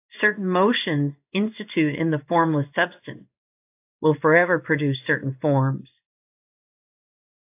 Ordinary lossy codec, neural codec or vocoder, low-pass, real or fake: AAC, 32 kbps; codec, 16 kHz in and 24 kHz out, 1 kbps, XY-Tokenizer; 3.6 kHz; fake